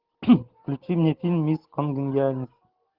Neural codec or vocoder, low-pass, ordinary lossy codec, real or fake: none; 5.4 kHz; Opus, 16 kbps; real